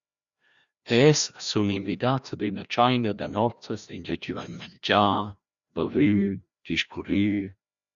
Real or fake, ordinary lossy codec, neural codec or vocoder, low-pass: fake; Opus, 64 kbps; codec, 16 kHz, 1 kbps, FreqCodec, larger model; 7.2 kHz